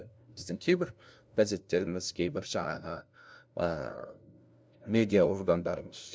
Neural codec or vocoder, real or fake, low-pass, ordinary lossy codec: codec, 16 kHz, 0.5 kbps, FunCodec, trained on LibriTTS, 25 frames a second; fake; none; none